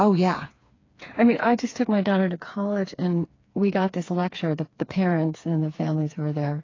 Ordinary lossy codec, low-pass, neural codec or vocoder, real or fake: AAC, 32 kbps; 7.2 kHz; codec, 16 kHz, 4 kbps, FreqCodec, smaller model; fake